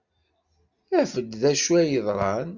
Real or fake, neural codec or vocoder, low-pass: real; none; 7.2 kHz